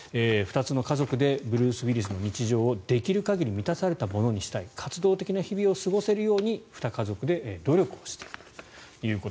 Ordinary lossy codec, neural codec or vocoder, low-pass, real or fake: none; none; none; real